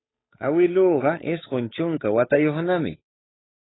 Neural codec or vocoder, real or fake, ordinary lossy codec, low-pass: codec, 16 kHz, 8 kbps, FunCodec, trained on Chinese and English, 25 frames a second; fake; AAC, 16 kbps; 7.2 kHz